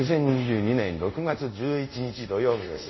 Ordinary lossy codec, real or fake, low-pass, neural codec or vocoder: MP3, 24 kbps; fake; 7.2 kHz; codec, 24 kHz, 0.5 kbps, DualCodec